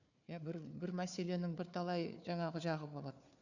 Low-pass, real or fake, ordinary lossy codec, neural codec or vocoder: 7.2 kHz; fake; none; codec, 16 kHz, 4 kbps, FunCodec, trained on Chinese and English, 50 frames a second